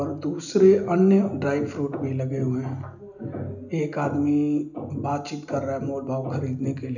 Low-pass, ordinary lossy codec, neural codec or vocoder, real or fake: 7.2 kHz; none; none; real